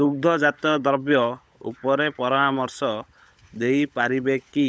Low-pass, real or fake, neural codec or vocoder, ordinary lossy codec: none; fake; codec, 16 kHz, 16 kbps, FunCodec, trained on LibriTTS, 50 frames a second; none